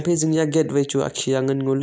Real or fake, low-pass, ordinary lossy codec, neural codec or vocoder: real; none; none; none